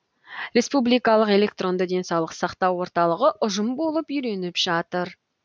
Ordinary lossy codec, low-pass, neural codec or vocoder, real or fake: none; none; none; real